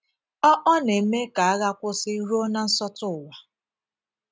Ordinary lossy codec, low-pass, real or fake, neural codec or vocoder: none; none; real; none